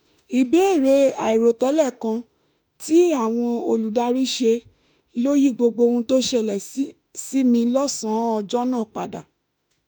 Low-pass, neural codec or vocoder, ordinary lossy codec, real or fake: none; autoencoder, 48 kHz, 32 numbers a frame, DAC-VAE, trained on Japanese speech; none; fake